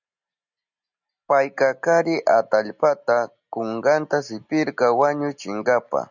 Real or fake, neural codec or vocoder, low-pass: real; none; 7.2 kHz